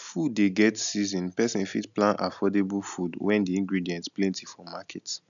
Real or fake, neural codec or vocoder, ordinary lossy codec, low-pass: real; none; none; 7.2 kHz